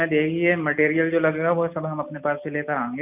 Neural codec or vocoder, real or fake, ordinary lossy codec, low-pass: none; real; none; 3.6 kHz